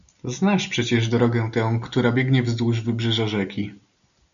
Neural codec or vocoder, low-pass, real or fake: none; 7.2 kHz; real